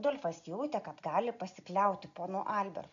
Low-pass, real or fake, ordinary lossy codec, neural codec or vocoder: 7.2 kHz; real; AAC, 96 kbps; none